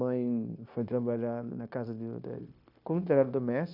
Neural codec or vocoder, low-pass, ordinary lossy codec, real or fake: codec, 16 kHz, 0.9 kbps, LongCat-Audio-Codec; 5.4 kHz; none; fake